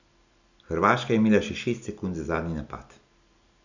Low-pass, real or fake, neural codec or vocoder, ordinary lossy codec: 7.2 kHz; real; none; none